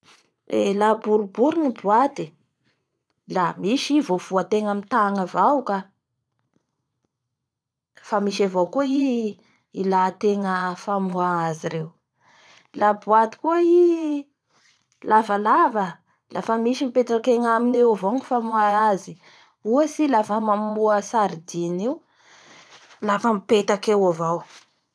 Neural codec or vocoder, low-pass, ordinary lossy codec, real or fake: vocoder, 22.05 kHz, 80 mel bands, Vocos; none; none; fake